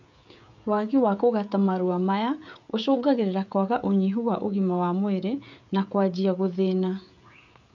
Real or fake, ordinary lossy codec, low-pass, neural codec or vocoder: fake; none; 7.2 kHz; codec, 16 kHz, 16 kbps, FreqCodec, smaller model